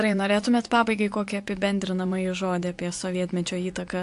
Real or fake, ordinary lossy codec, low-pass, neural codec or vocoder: fake; AAC, 64 kbps; 10.8 kHz; vocoder, 24 kHz, 100 mel bands, Vocos